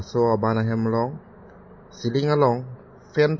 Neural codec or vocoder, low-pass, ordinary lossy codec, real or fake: none; 7.2 kHz; MP3, 32 kbps; real